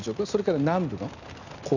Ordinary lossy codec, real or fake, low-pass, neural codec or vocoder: none; real; 7.2 kHz; none